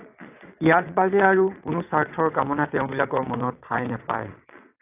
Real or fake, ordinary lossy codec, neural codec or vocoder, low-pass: fake; AAC, 32 kbps; vocoder, 22.05 kHz, 80 mel bands, WaveNeXt; 3.6 kHz